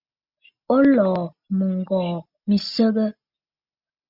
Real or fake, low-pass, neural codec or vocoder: real; 5.4 kHz; none